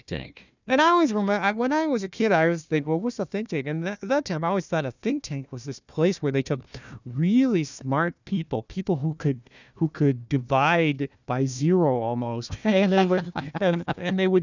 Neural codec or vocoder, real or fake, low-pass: codec, 16 kHz, 1 kbps, FunCodec, trained on Chinese and English, 50 frames a second; fake; 7.2 kHz